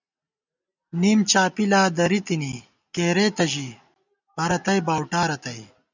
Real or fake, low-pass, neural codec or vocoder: real; 7.2 kHz; none